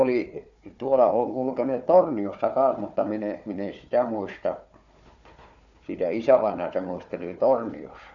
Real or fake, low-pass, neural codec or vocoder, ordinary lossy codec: fake; 7.2 kHz; codec, 16 kHz, 4 kbps, FunCodec, trained on Chinese and English, 50 frames a second; none